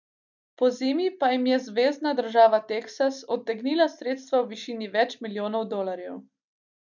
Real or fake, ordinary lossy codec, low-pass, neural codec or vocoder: real; none; 7.2 kHz; none